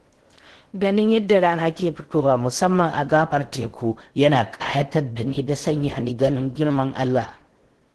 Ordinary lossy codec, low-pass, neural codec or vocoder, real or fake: Opus, 16 kbps; 10.8 kHz; codec, 16 kHz in and 24 kHz out, 0.6 kbps, FocalCodec, streaming, 2048 codes; fake